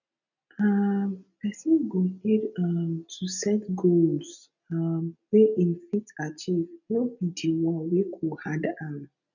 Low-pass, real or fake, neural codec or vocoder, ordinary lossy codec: 7.2 kHz; real; none; none